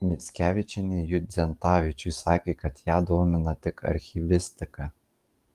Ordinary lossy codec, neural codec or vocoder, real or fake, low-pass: Opus, 32 kbps; codec, 44.1 kHz, 7.8 kbps, DAC; fake; 14.4 kHz